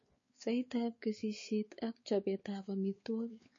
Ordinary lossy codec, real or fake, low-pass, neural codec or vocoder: MP3, 32 kbps; fake; 7.2 kHz; codec, 16 kHz, 4 kbps, X-Codec, WavLM features, trained on Multilingual LibriSpeech